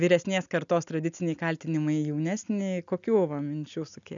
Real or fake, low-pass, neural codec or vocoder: real; 7.2 kHz; none